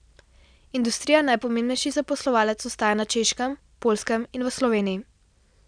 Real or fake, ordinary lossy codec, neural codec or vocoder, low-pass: real; none; none; 9.9 kHz